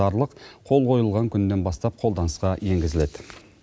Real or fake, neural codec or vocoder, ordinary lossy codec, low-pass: real; none; none; none